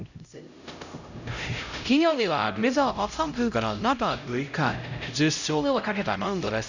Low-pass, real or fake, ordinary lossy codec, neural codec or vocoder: 7.2 kHz; fake; none; codec, 16 kHz, 0.5 kbps, X-Codec, HuBERT features, trained on LibriSpeech